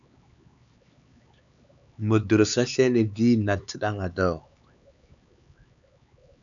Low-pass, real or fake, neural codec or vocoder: 7.2 kHz; fake; codec, 16 kHz, 4 kbps, X-Codec, HuBERT features, trained on LibriSpeech